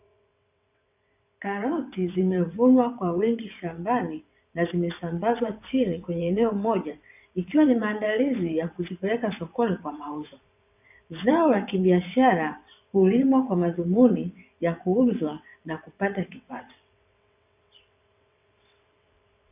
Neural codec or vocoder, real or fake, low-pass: vocoder, 22.05 kHz, 80 mel bands, WaveNeXt; fake; 3.6 kHz